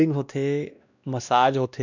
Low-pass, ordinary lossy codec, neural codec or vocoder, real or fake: 7.2 kHz; none; codec, 16 kHz, 1 kbps, X-Codec, WavLM features, trained on Multilingual LibriSpeech; fake